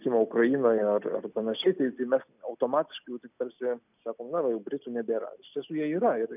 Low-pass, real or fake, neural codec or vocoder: 3.6 kHz; real; none